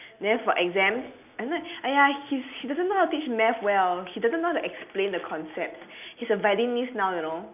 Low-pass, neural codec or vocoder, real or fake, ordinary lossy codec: 3.6 kHz; none; real; none